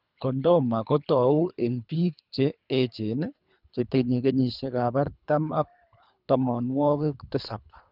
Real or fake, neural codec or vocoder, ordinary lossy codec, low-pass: fake; codec, 24 kHz, 3 kbps, HILCodec; none; 5.4 kHz